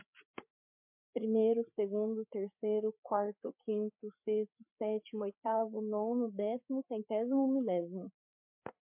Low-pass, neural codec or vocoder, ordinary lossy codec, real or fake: 3.6 kHz; codec, 16 kHz, 8 kbps, FreqCodec, larger model; MP3, 32 kbps; fake